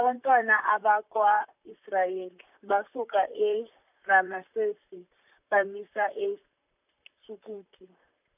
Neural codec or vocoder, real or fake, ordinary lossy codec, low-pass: vocoder, 44.1 kHz, 128 mel bands, Pupu-Vocoder; fake; none; 3.6 kHz